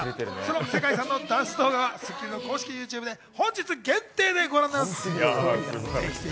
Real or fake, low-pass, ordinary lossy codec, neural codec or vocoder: real; none; none; none